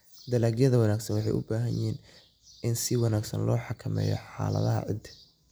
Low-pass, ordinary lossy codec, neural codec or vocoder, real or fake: none; none; none; real